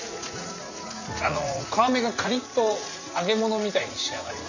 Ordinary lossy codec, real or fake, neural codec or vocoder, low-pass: none; fake; vocoder, 44.1 kHz, 80 mel bands, Vocos; 7.2 kHz